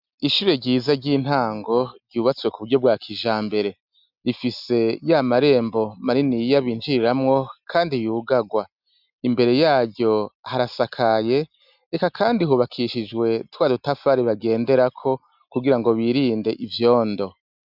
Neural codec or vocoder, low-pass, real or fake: none; 5.4 kHz; real